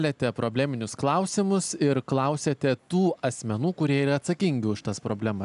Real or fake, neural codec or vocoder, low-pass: real; none; 10.8 kHz